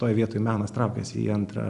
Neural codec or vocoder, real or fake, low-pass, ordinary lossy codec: none; real; 10.8 kHz; Opus, 64 kbps